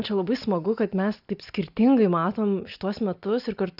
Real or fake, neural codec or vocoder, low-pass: real; none; 5.4 kHz